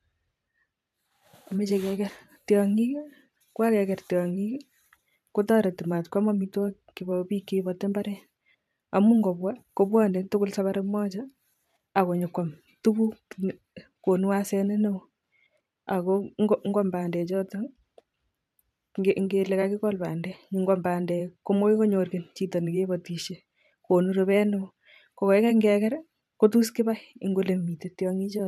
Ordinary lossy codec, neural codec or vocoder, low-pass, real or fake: MP3, 96 kbps; vocoder, 44.1 kHz, 128 mel bands every 512 samples, BigVGAN v2; 14.4 kHz; fake